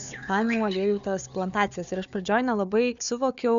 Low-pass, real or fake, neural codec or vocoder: 7.2 kHz; fake; codec, 16 kHz, 4 kbps, FunCodec, trained on Chinese and English, 50 frames a second